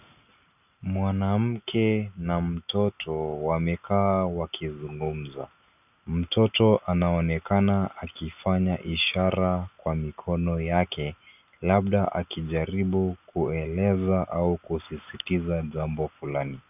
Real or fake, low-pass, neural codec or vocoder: real; 3.6 kHz; none